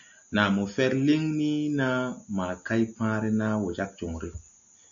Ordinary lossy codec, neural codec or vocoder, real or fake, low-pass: AAC, 64 kbps; none; real; 7.2 kHz